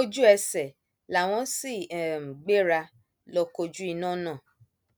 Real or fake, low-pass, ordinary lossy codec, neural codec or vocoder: real; none; none; none